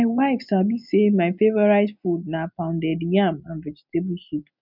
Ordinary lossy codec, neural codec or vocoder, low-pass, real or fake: none; none; 5.4 kHz; real